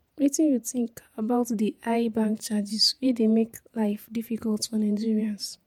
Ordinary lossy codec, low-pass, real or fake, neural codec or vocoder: MP3, 96 kbps; 19.8 kHz; fake; vocoder, 48 kHz, 128 mel bands, Vocos